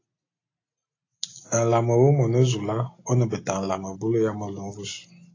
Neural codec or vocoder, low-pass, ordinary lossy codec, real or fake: none; 7.2 kHz; AAC, 32 kbps; real